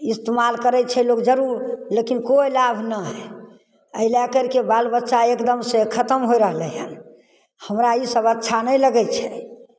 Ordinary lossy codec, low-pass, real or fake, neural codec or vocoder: none; none; real; none